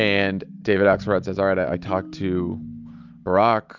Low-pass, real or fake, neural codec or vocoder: 7.2 kHz; real; none